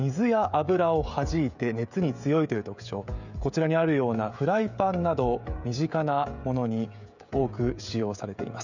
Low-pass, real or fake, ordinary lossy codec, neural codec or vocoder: 7.2 kHz; fake; none; codec, 16 kHz, 16 kbps, FreqCodec, smaller model